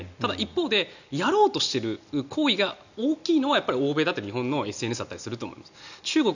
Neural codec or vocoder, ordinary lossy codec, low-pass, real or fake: none; none; 7.2 kHz; real